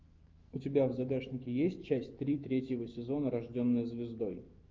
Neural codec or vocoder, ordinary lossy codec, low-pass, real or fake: autoencoder, 48 kHz, 128 numbers a frame, DAC-VAE, trained on Japanese speech; Opus, 32 kbps; 7.2 kHz; fake